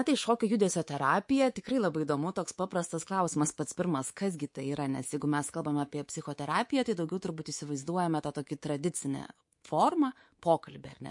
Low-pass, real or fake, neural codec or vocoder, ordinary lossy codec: 10.8 kHz; fake; codec, 24 kHz, 3.1 kbps, DualCodec; MP3, 48 kbps